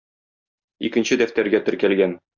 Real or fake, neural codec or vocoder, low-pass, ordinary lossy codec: real; none; 7.2 kHz; Opus, 64 kbps